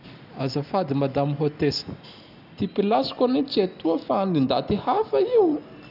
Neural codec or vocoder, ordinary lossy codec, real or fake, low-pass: none; none; real; 5.4 kHz